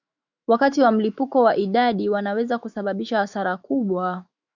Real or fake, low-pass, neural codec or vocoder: fake; 7.2 kHz; autoencoder, 48 kHz, 128 numbers a frame, DAC-VAE, trained on Japanese speech